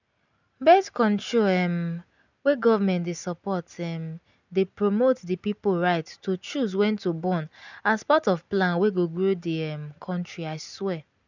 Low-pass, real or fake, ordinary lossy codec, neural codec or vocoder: 7.2 kHz; real; none; none